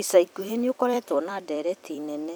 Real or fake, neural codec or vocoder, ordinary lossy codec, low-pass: fake; vocoder, 44.1 kHz, 128 mel bands every 256 samples, BigVGAN v2; none; none